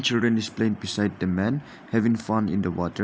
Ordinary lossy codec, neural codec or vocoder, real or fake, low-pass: none; none; real; none